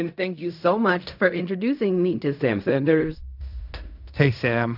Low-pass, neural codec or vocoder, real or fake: 5.4 kHz; codec, 16 kHz in and 24 kHz out, 0.4 kbps, LongCat-Audio-Codec, fine tuned four codebook decoder; fake